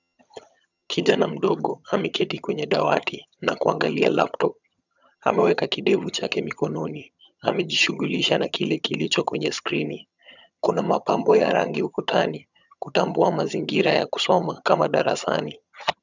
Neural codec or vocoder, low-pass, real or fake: vocoder, 22.05 kHz, 80 mel bands, HiFi-GAN; 7.2 kHz; fake